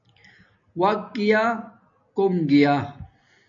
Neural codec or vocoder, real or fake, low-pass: none; real; 7.2 kHz